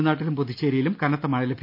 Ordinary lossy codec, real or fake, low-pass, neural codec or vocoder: none; real; 5.4 kHz; none